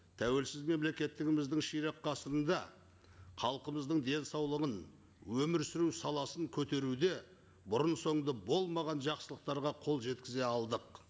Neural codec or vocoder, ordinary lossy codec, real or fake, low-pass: none; none; real; none